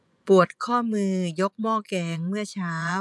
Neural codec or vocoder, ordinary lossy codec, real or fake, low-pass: vocoder, 24 kHz, 100 mel bands, Vocos; none; fake; none